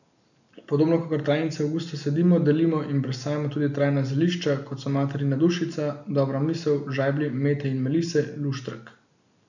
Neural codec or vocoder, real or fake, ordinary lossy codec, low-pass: none; real; none; 7.2 kHz